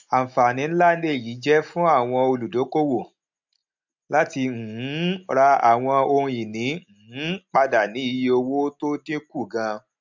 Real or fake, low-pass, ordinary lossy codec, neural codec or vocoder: real; 7.2 kHz; MP3, 64 kbps; none